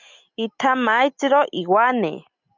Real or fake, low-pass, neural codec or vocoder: real; 7.2 kHz; none